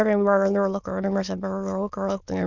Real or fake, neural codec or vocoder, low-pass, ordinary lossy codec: fake; autoencoder, 22.05 kHz, a latent of 192 numbers a frame, VITS, trained on many speakers; 7.2 kHz; none